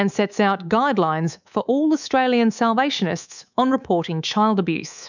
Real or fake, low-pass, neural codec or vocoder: fake; 7.2 kHz; codec, 24 kHz, 3.1 kbps, DualCodec